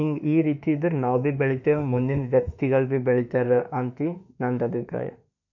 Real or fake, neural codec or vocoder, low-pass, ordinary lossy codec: fake; autoencoder, 48 kHz, 32 numbers a frame, DAC-VAE, trained on Japanese speech; 7.2 kHz; none